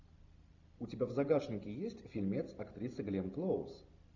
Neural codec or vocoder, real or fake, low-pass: none; real; 7.2 kHz